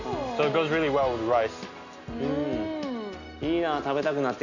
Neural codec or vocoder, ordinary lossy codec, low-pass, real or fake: none; none; 7.2 kHz; real